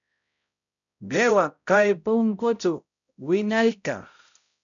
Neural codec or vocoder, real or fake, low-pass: codec, 16 kHz, 0.5 kbps, X-Codec, HuBERT features, trained on balanced general audio; fake; 7.2 kHz